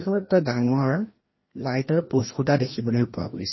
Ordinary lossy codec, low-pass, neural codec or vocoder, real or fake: MP3, 24 kbps; 7.2 kHz; codec, 16 kHz, 1 kbps, FreqCodec, larger model; fake